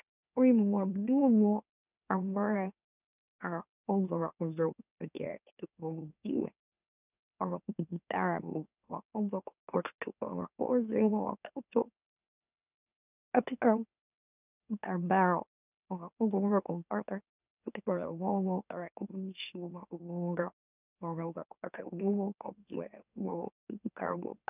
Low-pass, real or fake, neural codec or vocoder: 3.6 kHz; fake; autoencoder, 44.1 kHz, a latent of 192 numbers a frame, MeloTTS